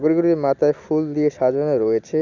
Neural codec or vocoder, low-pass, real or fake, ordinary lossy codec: none; 7.2 kHz; real; none